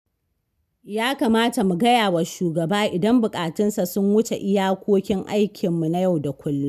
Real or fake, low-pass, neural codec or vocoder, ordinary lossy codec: real; 14.4 kHz; none; none